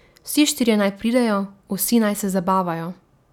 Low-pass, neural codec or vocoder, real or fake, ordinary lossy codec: 19.8 kHz; none; real; none